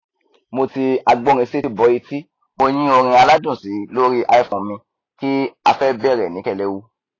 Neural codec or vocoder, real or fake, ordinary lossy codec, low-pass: none; real; AAC, 32 kbps; 7.2 kHz